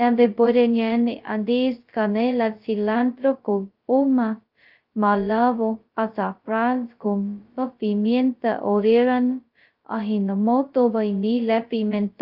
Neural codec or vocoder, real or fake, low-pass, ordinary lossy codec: codec, 16 kHz, 0.2 kbps, FocalCodec; fake; 5.4 kHz; Opus, 24 kbps